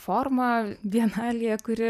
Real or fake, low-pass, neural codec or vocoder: fake; 14.4 kHz; vocoder, 44.1 kHz, 128 mel bands every 512 samples, BigVGAN v2